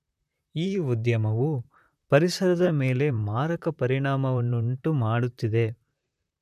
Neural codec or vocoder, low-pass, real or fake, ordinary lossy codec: vocoder, 44.1 kHz, 128 mel bands, Pupu-Vocoder; 14.4 kHz; fake; none